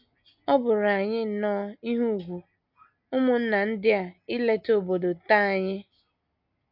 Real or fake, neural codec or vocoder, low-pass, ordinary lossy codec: real; none; 5.4 kHz; MP3, 48 kbps